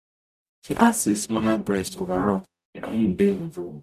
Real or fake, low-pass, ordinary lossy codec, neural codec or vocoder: fake; 14.4 kHz; none; codec, 44.1 kHz, 0.9 kbps, DAC